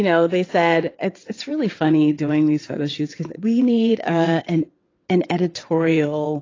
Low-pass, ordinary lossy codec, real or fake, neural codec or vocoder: 7.2 kHz; AAC, 32 kbps; fake; vocoder, 22.05 kHz, 80 mel bands, WaveNeXt